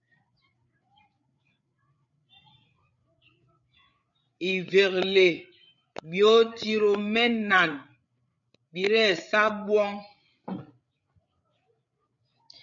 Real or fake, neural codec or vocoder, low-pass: fake; codec, 16 kHz, 8 kbps, FreqCodec, larger model; 7.2 kHz